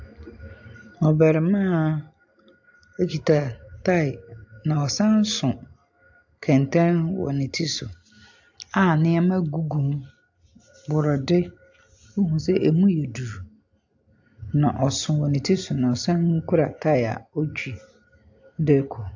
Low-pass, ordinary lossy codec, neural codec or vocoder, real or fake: 7.2 kHz; AAC, 48 kbps; none; real